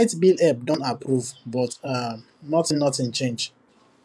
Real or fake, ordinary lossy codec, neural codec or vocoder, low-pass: fake; none; vocoder, 24 kHz, 100 mel bands, Vocos; none